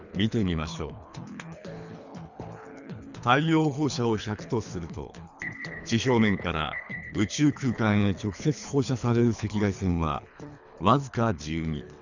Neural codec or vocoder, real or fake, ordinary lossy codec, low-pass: codec, 24 kHz, 3 kbps, HILCodec; fake; none; 7.2 kHz